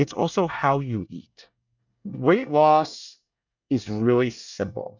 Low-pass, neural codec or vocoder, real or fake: 7.2 kHz; codec, 24 kHz, 1 kbps, SNAC; fake